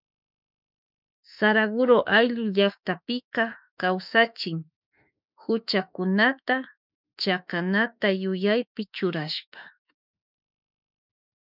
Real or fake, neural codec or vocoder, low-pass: fake; autoencoder, 48 kHz, 32 numbers a frame, DAC-VAE, trained on Japanese speech; 5.4 kHz